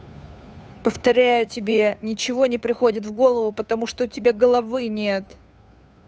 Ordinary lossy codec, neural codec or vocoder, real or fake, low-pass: none; codec, 16 kHz, 2 kbps, FunCodec, trained on Chinese and English, 25 frames a second; fake; none